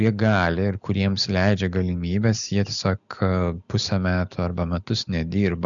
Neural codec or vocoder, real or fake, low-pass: none; real; 7.2 kHz